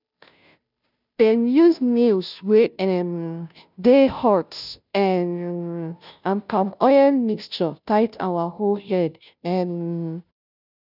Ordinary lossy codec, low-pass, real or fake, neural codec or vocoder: none; 5.4 kHz; fake; codec, 16 kHz, 0.5 kbps, FunCodec, trained on Chinese and English, 25 frames a second